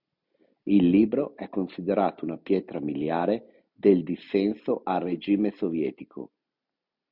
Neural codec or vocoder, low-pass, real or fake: none; 5.4 kHz; real